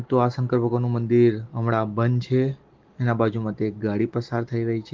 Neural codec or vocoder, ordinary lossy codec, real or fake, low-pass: none; Opus, 16 kbps; real; 7.2 kHz